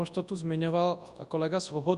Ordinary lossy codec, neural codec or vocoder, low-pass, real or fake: Opus, 64 kbps; codec, 24 kHz, 0.9 kbps, WavTokenizer, large speech release; 10.8 kHz; fake